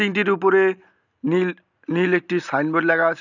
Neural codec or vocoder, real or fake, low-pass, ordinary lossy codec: none; real; 7.2 kHz; none